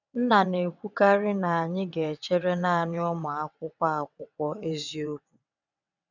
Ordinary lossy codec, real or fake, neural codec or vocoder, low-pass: none; fake; vocoder, 22.05 kHz, 80 mel bands, WaveNeXt; 7.2 kHz